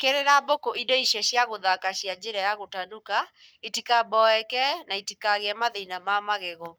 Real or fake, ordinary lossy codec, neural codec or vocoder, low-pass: fake; none; codec, 44.1 kHz, 7.8 kbps, Pupu-Codec; none